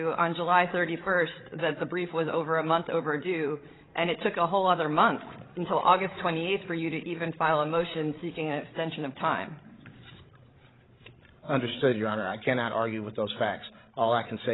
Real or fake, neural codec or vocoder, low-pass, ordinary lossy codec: fake; codec, 16 kHz, 16 kbps, FreqCodec, larger model; 7.2 kHz; AAC, 16 kbps